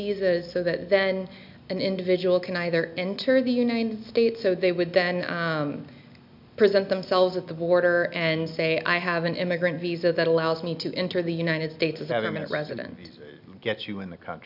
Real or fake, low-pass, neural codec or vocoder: real; 5.4 kHz; none